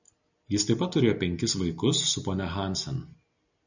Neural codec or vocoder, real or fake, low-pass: none; real; 7.2 kHz